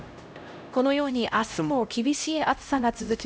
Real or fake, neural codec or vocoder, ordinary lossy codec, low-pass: fake; codec, 16 kHz, 0.5 kbps, X-Codec, HuBERT features, trained on LibriSpeech; none; none